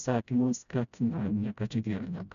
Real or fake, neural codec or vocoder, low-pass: fake; codec, 16 kHz, 0.5 kbps, FreqCodec, smaller model; 7.2 kHz